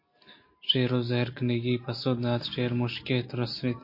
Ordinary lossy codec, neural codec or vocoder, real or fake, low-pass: MP3, 32 kbps; none; real; 5.4 kHz